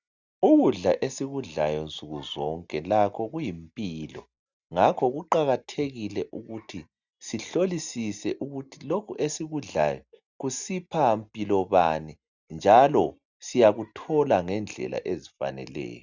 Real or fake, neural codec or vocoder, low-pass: real; none; 7.2 kHz